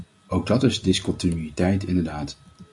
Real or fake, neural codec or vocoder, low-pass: real; none; 10.8 kHz